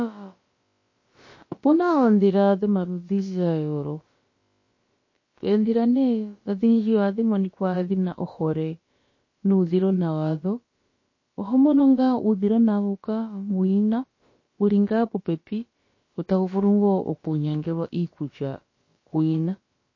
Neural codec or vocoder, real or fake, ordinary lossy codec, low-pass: codec, 16 kHz, about 1 kbps, DyCAST, with the encoder's durations; fake; MP3, 32 kbps; 7.2 kHz